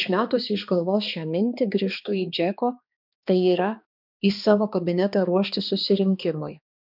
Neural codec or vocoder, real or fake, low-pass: codec, 16 kHz, 2 kbps, X-Codec, HuBERT features, trained on balanced general audio; fake; 5.4 kHz